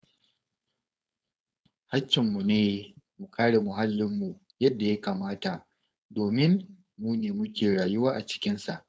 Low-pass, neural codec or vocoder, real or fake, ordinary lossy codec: none; codec, 16 kHz, 4.8 kbps, FACodec; fake; none